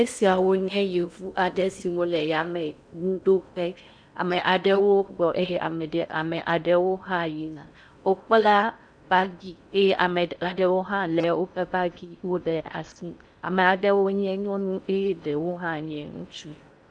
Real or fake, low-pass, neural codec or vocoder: fake; 9.9 kHz; codec, 16 kHz in and 24 kHz out, 0.6 kbps, FocalCodec, streaming, 4096 codes